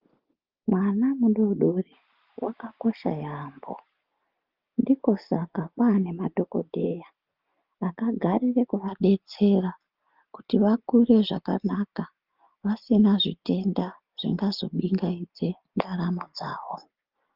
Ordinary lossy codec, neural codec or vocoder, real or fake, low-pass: Opus, 24 kbps; none; real; 5.4 kHz